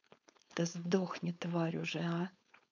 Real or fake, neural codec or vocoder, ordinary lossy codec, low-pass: fake; codec, 16 kHz, 4.8 kbps, FACodec; none; 7.2 kHz